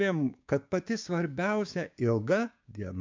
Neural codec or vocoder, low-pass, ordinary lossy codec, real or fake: codec, 16 kHz, 6 kbps, DAC; 7.2 kHz; MP3, 64 kbps; fake